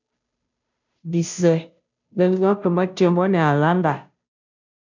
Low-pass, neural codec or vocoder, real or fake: 7.2 kHz; codec, 16 kHz, 0.5 kbps, FunCodec, trained on Chinese and English, 25 frames a second; fake